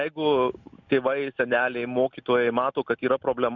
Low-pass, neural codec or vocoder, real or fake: 7.2 kHz; none; real